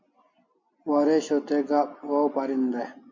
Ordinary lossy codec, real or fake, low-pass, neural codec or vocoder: MP3, 48 kbps; real; 7.2 kHz; none